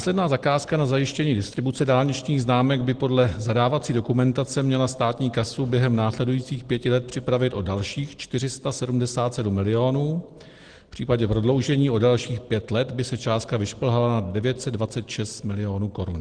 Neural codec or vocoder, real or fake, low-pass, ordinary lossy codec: none; real; 9.9 kHz; Opus, 16 kbps